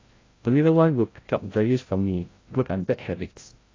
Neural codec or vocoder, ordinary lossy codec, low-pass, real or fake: codec, 16 kHz, 0.5 kbps, FreqCodec, larger model; AAC, 32 kbps; 7.2 kHz; fake